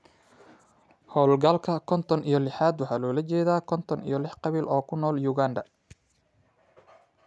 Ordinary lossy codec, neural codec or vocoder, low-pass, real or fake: none; none; none; real